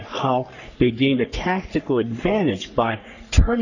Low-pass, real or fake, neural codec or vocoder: 7.2 kHz; fake; codec, 44.1 kHz, 3.4 kbps, Pupu-Codec